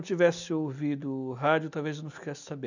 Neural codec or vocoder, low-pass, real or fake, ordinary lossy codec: none; 7.2 kHz; real; none